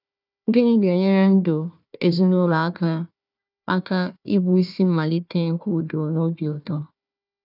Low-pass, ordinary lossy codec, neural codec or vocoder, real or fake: 5.4 kHz; none; codec, 16 kHz, 1 kbps, FunCodec, trained on Chinese and English, 50 frames a second; fake